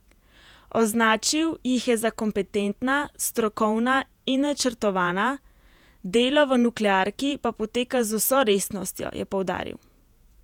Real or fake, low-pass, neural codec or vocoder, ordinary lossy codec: fake; 19.8 kHz; vocoder, 48 kHz, 128 mel bands, Vocos; none